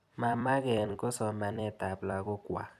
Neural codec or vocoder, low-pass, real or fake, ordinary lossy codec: vocoder, 44.1 kHz, 128 mel bands every 256 samples, BigVGAN v2; 14.4 kHz; fake; none